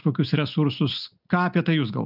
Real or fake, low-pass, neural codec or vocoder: real; 5.4 kHz; none